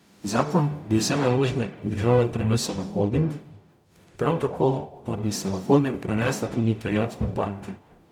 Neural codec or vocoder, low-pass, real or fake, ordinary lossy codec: codec, 44.1 kHz, 0.9 kbps, DAC; 19.8 kHz; fake; none